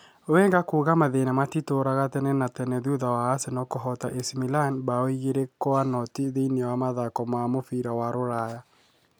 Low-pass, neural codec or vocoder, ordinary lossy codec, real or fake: none; none; none; real